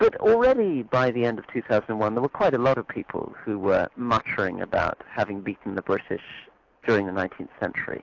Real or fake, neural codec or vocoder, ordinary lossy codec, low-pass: real; none; AAC, 48 kbps; 7.2 kHz